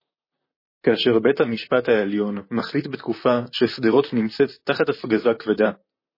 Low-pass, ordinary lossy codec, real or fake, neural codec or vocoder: 5.4 kHz; MP3, 24 kbps; fake; vocoder, 44.1 kHz, 128 mel bands, Pupu-Vocoder